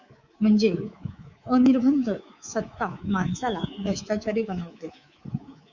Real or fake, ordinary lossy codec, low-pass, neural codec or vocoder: fake; Opus, 64 kbps; 7.2 kHz; codec, 24 kHz, 3.1 kbps, DualCodec